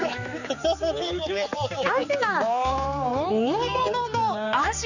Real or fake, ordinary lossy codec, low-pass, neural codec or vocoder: fake; none; 7.2 kHz; codec, 16 kHz, 4 kbps, X-Codec, HuBERT features, trained on general audio